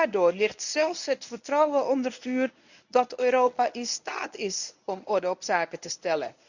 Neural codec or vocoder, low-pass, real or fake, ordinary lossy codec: codec, 24 kHz, 0.9 kbps, WavTokenizer, medium speech release version 1; 7.2 kHz; fake; none